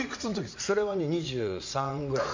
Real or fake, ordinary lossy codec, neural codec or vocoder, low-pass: fake; MP3, 64 kbps; vocoder, 44.1 kHz, 80 mel bands, Vocos; 7.2 kHz